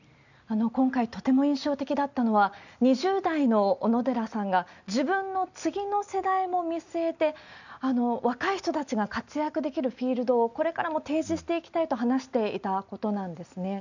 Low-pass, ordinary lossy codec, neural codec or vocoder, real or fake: 7.2 kHz; none; none; real